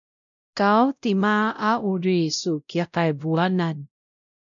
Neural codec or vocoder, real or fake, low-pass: codec, 16 kHz, 0.5 kbps, X-Codec, WavLM features, trained on Multilingual LibriSpeech; fake; 7.2 kHz